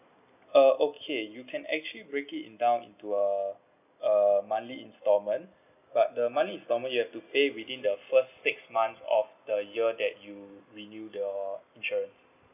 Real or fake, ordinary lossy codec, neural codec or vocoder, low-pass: real; none; none; 3.6 kHz